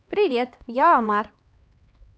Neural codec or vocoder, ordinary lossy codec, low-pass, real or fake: codec, 16 kHz, 2 kbps, X-Codec, HuBERT features, trained on LibriSpeech; none; none; fake